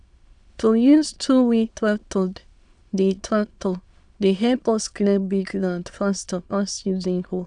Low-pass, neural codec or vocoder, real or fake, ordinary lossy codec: 9.9 kHz; autoencoder, 22.05 kHz, a latent of 192 numbers a frame, VITS, trained on many speakers; fake; Opus, 64 kbps